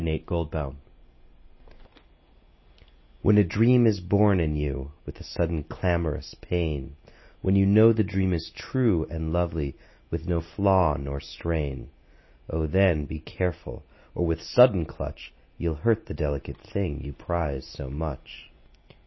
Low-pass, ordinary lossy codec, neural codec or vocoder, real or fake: 7.2 kHz; MP3, 24 kbps; none; real